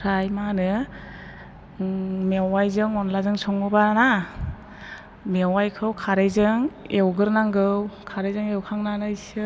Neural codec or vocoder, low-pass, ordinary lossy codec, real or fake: none; none; none; real